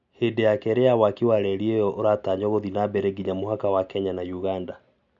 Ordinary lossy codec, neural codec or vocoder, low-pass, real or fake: none; none; 7.2 kHz; real